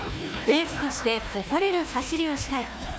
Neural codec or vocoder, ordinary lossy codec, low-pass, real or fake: codec, 16 kHz, 1 kbps, FunCodec, trained on Chinese and English, 50 frames a second; none; none; fake